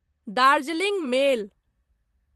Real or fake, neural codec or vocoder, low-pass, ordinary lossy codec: fake; vocoder, 44.1 kHz, 128 mel bands, Pupu-Vocoder; 14.4 kHz; Opus, 24 kbps